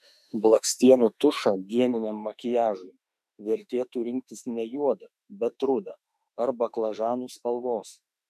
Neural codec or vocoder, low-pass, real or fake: autoencoder, 48 kHz, 32 numbers a frame, DAC-VAE, trained on Japanese speech; 14.4 kHz; fake